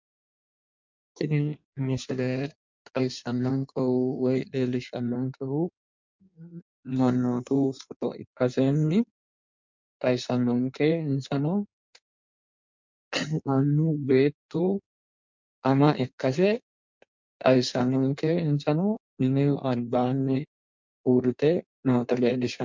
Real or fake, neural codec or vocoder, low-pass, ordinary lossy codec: fake; codec, 16 kHz in and 24 kHz out, 1.1 kbps, FireRedTTS-2 codec; 7.2 kHz; MP3, 64 kbps